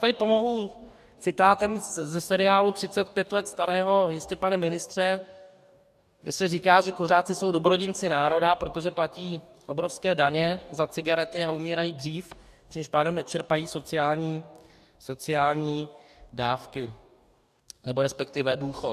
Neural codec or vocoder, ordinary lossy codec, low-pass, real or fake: codec, 44.1 kHz, 2.6 kbps, DAC; MP3, 96 kbps; 14.4 kHz; fake